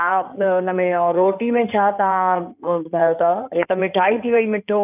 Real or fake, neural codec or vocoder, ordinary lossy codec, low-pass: fake; codec, 16 kHz in and 24 kHz out, 2.2 kbps, FireRedTTS-2 codec; none; 3.6 kHz